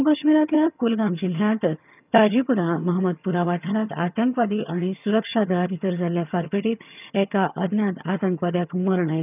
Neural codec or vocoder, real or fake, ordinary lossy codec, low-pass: vocoder, 22.05 kHz, 80 mel bands, HiFi-GAN; fake; none; 3.6 kHz